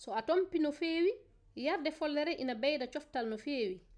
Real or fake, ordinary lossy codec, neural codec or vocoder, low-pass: real; none; none; 9.9 kHz